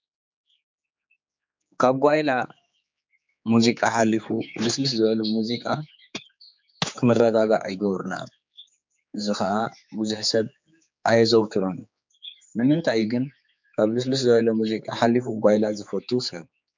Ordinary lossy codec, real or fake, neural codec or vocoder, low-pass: MP3, 64 kbps; fake; codec, 16 kHz, 4 kbps, X-Codec, HuBERT features, trained on general audio; 7.2 kHz